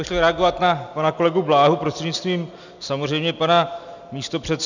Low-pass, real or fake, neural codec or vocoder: 7.2 kHz; real; none